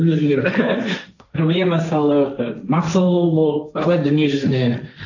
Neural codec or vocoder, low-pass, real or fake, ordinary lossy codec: codec, 16 kHz, 1.1 kbps, Voila-Tokenizer; 7.2 kHz; fake; none